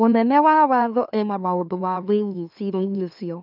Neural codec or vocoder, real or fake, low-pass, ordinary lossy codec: autoencoder, 44.1 kHz, a latent of 192 numbers a frame, MeloTTS; fake; 5.4 kHz; none